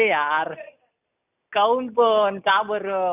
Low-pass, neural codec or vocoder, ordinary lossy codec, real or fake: 3.6 kHz; none; none; real